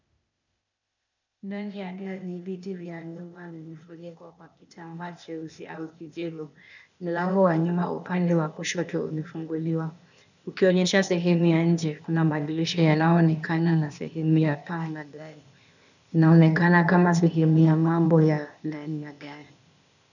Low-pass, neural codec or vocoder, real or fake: 7.2 kHz; codec, 16 kHz, 0.8 kbps, ZipCodec; fake